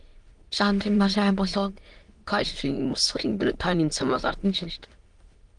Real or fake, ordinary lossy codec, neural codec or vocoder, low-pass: fake; Opus, 24 kbps; autoencoder, 22.05 kHz, a latent of 192 numbers a frame, VITS, trained on many speakers; 9.9 kHz